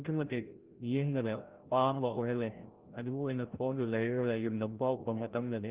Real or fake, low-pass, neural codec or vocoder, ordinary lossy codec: fake; 3.6 kHz; codec, 16 kHz, 0.5 kbps, FreqCodec, larger model; Opus, 16 kbps